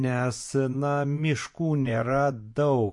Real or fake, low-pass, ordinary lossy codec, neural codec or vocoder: fake; 10.8 kHz; MP3, 48 kbps; vocoder, 24 kHz, 100 mel bands, Vocos